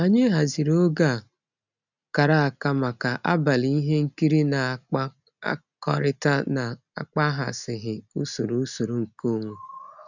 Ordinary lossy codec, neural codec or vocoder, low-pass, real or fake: none; none; 7.2 kHz; real